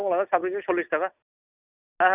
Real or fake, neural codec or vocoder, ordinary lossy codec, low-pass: real; none; none; 3.6 kHz